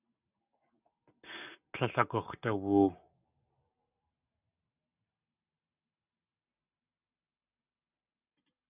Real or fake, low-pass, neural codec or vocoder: real; 3.6 kHz; none